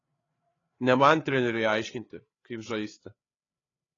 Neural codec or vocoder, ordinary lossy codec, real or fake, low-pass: codec, 16 kHz, 8 kbps, FreqCodec, larger model; AAC, 32 kbps; fake; 7.2 kHz